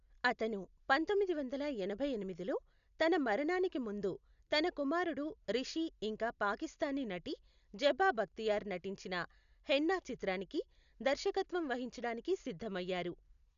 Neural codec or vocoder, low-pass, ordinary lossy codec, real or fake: none; 7.2 kHz; none; real